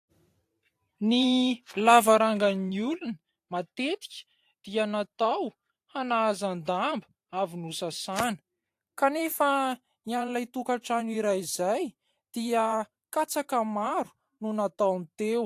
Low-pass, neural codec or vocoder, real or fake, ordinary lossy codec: 14.4 kHz; vocoder, 44.1 kHz, 128 mel bands every 512 samples, BigVGAN v2; fake; AAC, 64 kbps